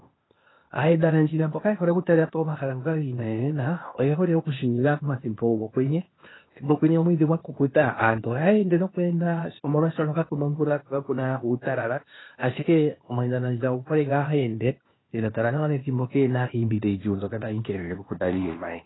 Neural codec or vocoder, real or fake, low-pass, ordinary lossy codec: codec, 16 kHz, 0.8 kbps, ZipCodec; fake; 7.2 kHz; AAC, 16 kbps